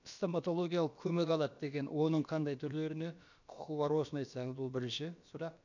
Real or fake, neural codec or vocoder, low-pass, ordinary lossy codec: fake; codec, 16 kHz, about 1 kbps, DyCAST, with the encoder's durations; 7.2 kHz; none